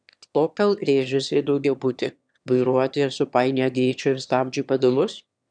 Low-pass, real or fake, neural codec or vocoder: 9.9 kHz; fake; autoencoder, 22.05 kHz, a latent of 192 numbers a frame, VITS, trained on one speaker